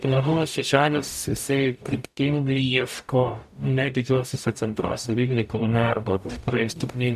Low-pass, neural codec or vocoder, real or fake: 14.4 kHz; codec, 44.1 kHz, 0.9 kbps, DAC; fake